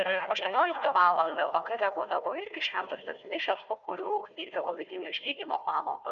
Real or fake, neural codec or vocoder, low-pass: fake; codec, 16 kHz, 1 kbps, FunCodec, trained on Chinese and English, 50 frames a second; 7.2 kHz